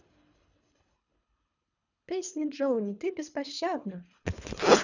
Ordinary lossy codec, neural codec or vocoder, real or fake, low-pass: none; codec, 24 kHz, 3 kbps, HILCodec; fake; 7.2 kHz